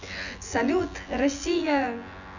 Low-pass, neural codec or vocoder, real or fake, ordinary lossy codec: 7.2 kHz; vocoder, 24 kHz, 100 mel bands, Vocos; fake; none